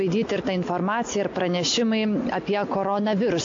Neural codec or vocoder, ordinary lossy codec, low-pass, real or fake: none; AAC, 48 kbps; 7.2 kHz; real